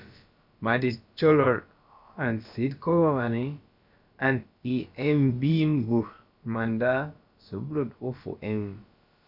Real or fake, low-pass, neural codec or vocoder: fake; 5.4 kHz; codec, 16 kHz, about 1 kbps, DyCAST, with the encoder's durations